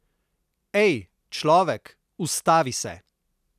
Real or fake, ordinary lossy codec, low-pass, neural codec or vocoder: real; none; 14.4 kHz; none